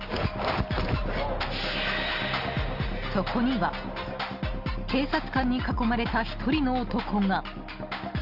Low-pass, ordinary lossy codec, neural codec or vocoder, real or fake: 5.4 kHz; Opus, 24 kbps; none; real